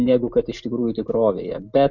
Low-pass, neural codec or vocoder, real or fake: 7.2 kHz; none; real